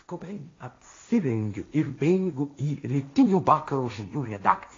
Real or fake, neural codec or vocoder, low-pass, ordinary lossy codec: fake; codec, 16 kHz, 1.1 kbps, Voila-Tokenizer; 7.2 kHz; AAC, 32 kbps